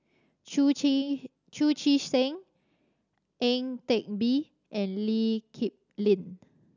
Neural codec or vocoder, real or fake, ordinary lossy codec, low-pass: none; real; none; 7.2 kHz